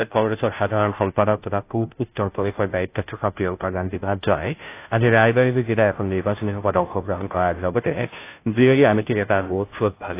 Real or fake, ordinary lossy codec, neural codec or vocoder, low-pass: fake; AAC, 24 kbps; codec, 16 kHz, 0.5 kbps, FunCodec, trained on Chinese and English, 25 frames a second; 3.6 kHz